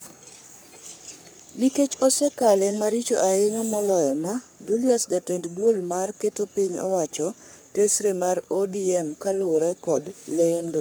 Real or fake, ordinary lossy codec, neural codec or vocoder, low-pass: fake; none; codec, 44.1 kHz, 3.4 kbps, Pupu-Codec; none